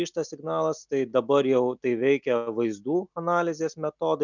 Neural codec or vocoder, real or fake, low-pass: none; real; 7.2 kHz